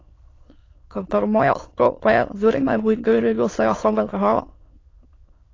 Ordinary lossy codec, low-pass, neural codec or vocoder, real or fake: AAC, 32 kbps; 7.2 kHz; autoencoder, 22.05 kHz, a latent of 192 numbers a frame, VITS, trained on many speakers; fake